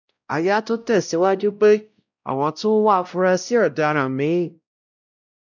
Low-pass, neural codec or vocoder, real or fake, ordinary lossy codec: 7.2 kHz; codec, 16 kHz, 0.5 kbps, X-Codec, WavLM features, trained on Multilingual LibriSpeech; fake; none